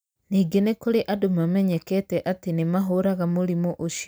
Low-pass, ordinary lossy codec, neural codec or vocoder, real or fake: none; none; none; real